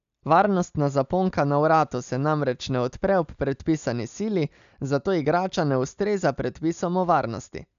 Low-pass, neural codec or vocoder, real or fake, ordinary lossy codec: 7.2 kHz; none; real; none